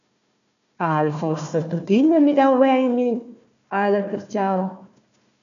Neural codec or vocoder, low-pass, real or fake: codec, 16 kHz, 1 kbps, FunCodec, trained on Chinese and English, 50 frames a second; 7.2 kHz; fake